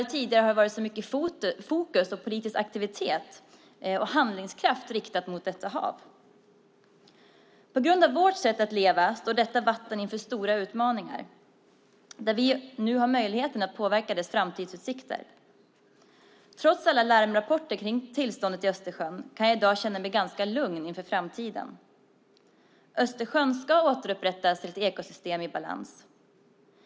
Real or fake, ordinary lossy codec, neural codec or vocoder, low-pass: real; none; none; none